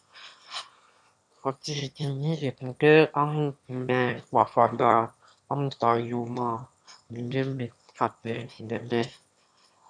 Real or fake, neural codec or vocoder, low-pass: fake; autoencoder, 22.05 kHz, a latent of 192 numbers a frame, VITS, trained on one speaker; 9.9 kHz